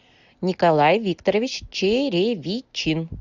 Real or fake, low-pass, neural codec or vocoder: real; 7.2 kHz; none